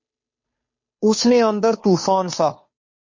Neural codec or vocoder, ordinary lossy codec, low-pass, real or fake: codec, 16 kHz, 2 kbps, FunCodec, trained on Chinese and English, 25 frames a second; MP3, 32 kbps; 7.2 kHz; fake